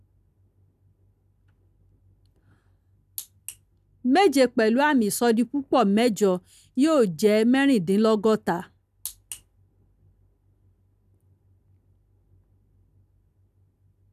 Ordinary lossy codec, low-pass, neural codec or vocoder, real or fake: none; 14.4 kHz; none; real